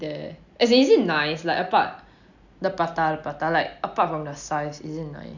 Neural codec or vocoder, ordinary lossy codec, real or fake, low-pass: none; none; real; 7.2 kHz